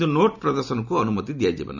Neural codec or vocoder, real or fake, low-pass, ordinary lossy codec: none; real; 7.2 kHz; none